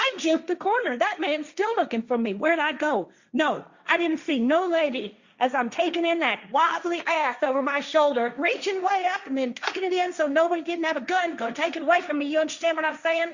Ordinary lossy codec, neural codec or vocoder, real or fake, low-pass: Opus, 64 kbps; codec, 16 kHz, 1.1 kbps, Voila-Tokenizer; fake; 7.2 kHz